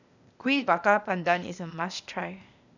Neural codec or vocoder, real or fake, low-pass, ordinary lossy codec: codec, 16 kHz, 0.8 kbps, ZipCodec; fake; 7.2 kHz; none